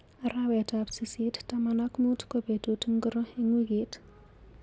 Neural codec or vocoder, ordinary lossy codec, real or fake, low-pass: none; none; real; none